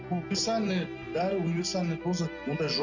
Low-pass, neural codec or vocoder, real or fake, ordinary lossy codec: 7.2 kHz; none; real; none